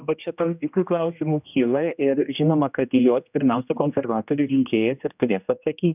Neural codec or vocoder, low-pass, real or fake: codec, 16 kHz, 1 kbps, X-Codec, HuBERT features, trained on general audio; 3.6 kHz; fake